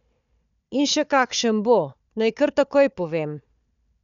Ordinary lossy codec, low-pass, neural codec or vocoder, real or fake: none; 7.2 kHz; codec, 16 kHz, 4 kbps, FunCodec, trained on Chinese and English, 50 frames a second; fake